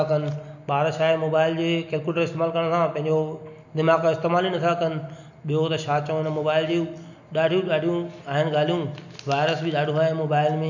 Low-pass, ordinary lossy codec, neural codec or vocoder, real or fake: 7.2 kHz; none; none; real